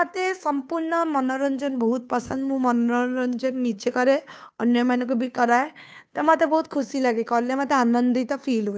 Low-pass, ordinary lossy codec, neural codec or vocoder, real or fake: none; none; codec, 16 kHz, 2 kbps, FunCodec, trained on Chinese and English, 25 frames a second; fake